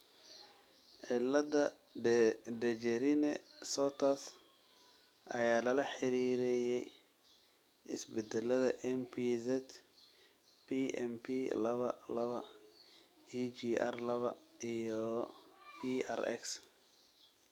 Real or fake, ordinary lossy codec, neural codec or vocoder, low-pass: fake; none; codec, 44.1 kHz, 7.8 kbps, DAC; 19.8 kHz